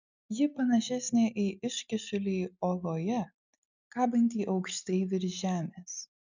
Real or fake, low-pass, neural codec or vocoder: real; 7.2 kHz; none